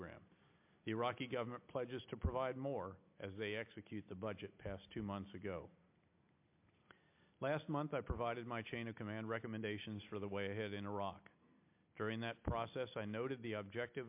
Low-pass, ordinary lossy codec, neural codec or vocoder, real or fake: 3.6 kHz; MP3, 32 kbps; none; real